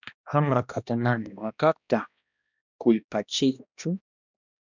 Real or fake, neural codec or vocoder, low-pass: fake; codec, 16 kHz, 1 kbps, X-Codec, HuBERT features, trained on balanced general audio; 7.2 kHz